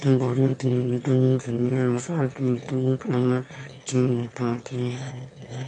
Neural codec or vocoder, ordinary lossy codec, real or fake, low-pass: autoencoder, 22.05 kHz, a latent of 192 numbers a frame, VITS, trained on one speaker; MP3, 48 kbps; fake; 9.9 kHz